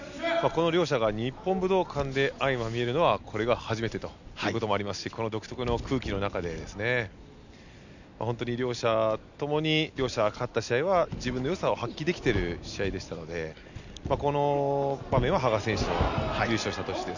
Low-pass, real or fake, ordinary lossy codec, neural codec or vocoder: 7.2 kHz; real; none; none